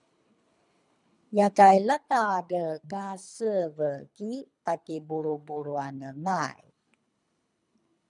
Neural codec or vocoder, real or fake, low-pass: codec, 24 kHz, 3 kbps, HILCodec; fake; 10.8 kHz